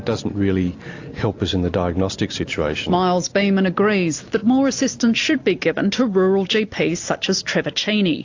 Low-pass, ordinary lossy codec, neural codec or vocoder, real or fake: 7.2 kHz; AAC, 48 kbps; none; real